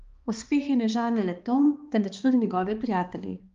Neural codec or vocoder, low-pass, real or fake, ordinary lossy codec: codec, 16 kHz, 2 kbps, X-Codec, HuBERT features, trained on balanced general audio; 7.2 kHz; fake; Opus, 32 kbps